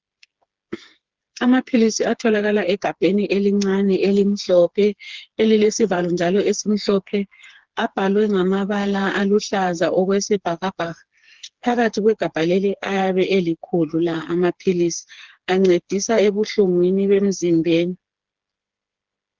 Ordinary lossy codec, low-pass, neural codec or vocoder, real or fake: Opus, 16 kbps; 7.2 kHz; codec, 16 kHz, 4 kbps, FreqCodec, smaller model; fake